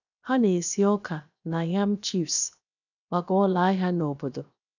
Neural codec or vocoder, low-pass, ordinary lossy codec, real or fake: codec, 16 kHz, 0.7 kbps, FocalCodec; 7.2 kHz; none; fake